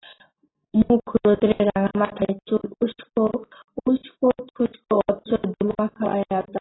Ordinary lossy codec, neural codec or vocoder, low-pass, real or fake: AAC, 16 kbps; none; 7.2 kHz; real